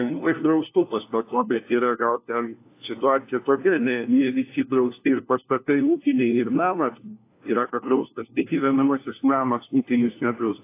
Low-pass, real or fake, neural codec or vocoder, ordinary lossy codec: 3.6 kHz; fake; codec, 16 kHz, 1 kbps, FunCodec, trained on LibriTTS, 50 frames a second; AAC, 24 kbps